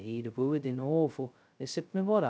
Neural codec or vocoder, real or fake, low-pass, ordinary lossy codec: codec, 16 kHz, 0.2 kbps, FocalCodec; fake; none; none